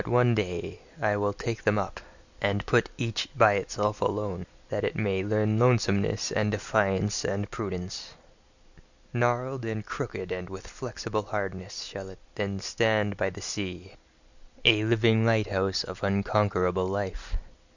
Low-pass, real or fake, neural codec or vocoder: 7.2 kHz; real; none